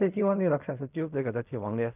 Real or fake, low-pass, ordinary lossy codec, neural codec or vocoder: fake; 3.6 kHz; none; codec, 16 kHz in and 24 kHz out, 0.4 kbps, LongCat-Audio-Codec, fine tuned four codebook decoder